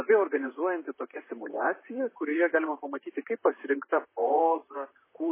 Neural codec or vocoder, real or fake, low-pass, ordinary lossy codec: vocoder, 44.1 kHz, 128 mel bands, Pupu-Vocoder; fake; 3.6 kHz; MP3, 16 kbps